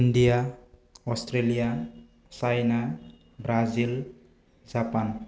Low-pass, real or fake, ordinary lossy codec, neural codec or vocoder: none; real; none; none